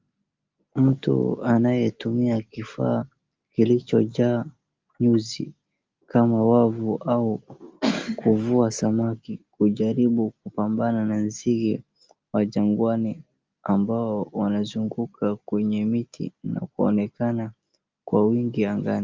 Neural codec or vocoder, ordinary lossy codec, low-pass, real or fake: none; Opus, 24 kbps; 7.2 kHz; real